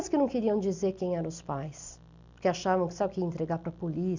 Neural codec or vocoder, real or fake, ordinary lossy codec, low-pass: none; real; Opus, 64 kbps; 7.2 kHz